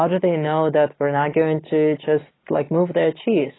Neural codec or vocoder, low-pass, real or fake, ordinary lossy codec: none; 7.2 kHz; real; AAC, 16 kbps